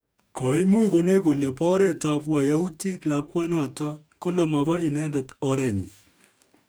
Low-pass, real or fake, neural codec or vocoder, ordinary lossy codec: none; fake; codec, 44.1 kHz, 2.6 kbps, DAC; none